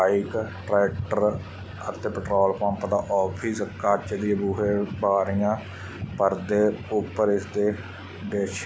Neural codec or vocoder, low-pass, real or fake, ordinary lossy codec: none; none; real; none